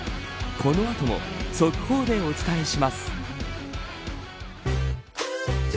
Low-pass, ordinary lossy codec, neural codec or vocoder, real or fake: none; none; none; real